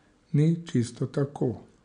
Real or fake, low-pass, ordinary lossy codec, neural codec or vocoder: real; 9.9 kHz; none; none